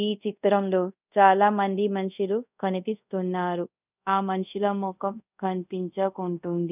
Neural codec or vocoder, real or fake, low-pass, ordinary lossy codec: codec, 24 kHz, 0.5 kbps, DualCodec; fake; 3.6 kHz; none